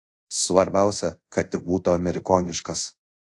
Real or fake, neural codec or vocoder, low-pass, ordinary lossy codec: fake; codec, 24 kHz, 0.5 kbps, DualCodec; 10.8 kHz; AAC, 48 kbps